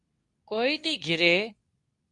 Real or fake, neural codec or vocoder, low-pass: fake; codec, 24 kHz, 0.9 kbps, WavTokenizer, medium speech release version 2; 10.8 kHz